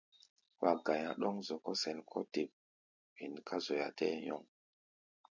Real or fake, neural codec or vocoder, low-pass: real; none; 7.2 kHz